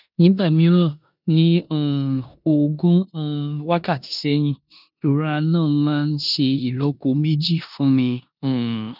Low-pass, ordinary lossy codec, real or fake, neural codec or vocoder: 5.4 kHz; none; fake; codec, 16 kHz in and 24 kHz out, 0.9 kbps, LongCat-Audio-Codec, four codebook decoder